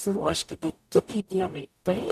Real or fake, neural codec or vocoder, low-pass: fake; codec, 44.1 kHz, 0.9 kbps, DAC; 14.4 kHz